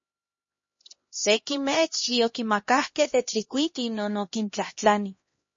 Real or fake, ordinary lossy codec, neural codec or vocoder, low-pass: fake; MP3, 32 kbps; codec, 16 kHz, 1 kbps, X-Codec, HuBERT features, trained on LibriSpeech; 7.2 kHz